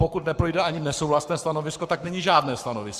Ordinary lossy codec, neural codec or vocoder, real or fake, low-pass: Opus, 64 kbps; codec, 44.1 kHz, 7.8 kbps, Pupu-Codec; fake; 14.4 kHz